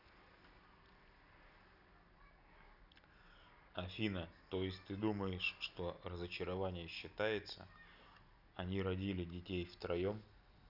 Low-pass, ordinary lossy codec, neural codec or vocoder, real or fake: 5.4 kHz; none; none; real